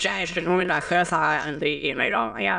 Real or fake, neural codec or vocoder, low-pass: fake; autoencoder, 22.05 kHz, a latent of 192 numbers a frame, VITS, trained on many speakers; 9.9 kHz